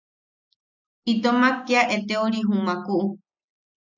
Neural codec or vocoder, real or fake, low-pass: none; real; 7.2 kHz